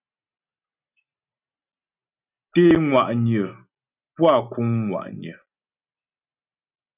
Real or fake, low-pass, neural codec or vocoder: real; 3.6 kHz; none